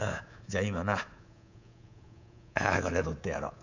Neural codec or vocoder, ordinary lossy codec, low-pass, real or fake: codec, 24 kHz, 3.1 kbps, DualCodec; none; 7.2 kHz; fake